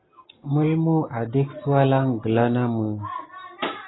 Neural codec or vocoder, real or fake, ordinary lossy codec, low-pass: none; real; AAC, 16 kbps; 7.2 kHz